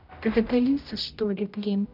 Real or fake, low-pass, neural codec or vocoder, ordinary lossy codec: fake; 5.4 kHz; codec, 16 kHz, 0.5 kbps, X-Codec, HuBERT features, trained on general audio; none